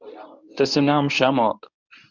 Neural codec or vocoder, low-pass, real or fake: codec, 24 kHz, 0.9 kbps, WavTokenizer, medium speech release version 1; 7.2 kHz; fake